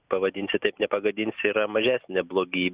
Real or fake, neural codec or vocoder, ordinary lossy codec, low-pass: real; none; Opus, 64 kbps; 3.6 kHz